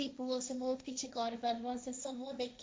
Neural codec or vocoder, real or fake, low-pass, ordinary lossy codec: codec, 16 kHz, 1.1 kbps, Voila-Tokenizer; fake; none; none